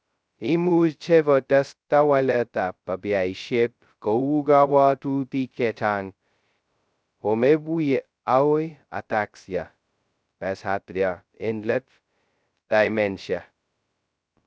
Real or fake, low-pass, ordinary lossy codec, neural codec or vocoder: fake; none; none; codec, 16 kHz, 0.2 kbps, FocalCodec